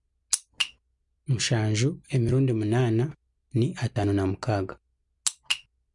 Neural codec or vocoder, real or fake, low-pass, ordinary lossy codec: none; real; 10.8 kHz; MP3, 64 kbps